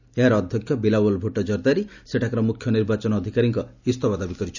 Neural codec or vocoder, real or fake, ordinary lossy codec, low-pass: none; real; none; 7.2 kHz